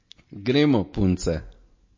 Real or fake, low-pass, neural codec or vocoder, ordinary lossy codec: real; 7.2 kHz; none; MP3, 32 kbps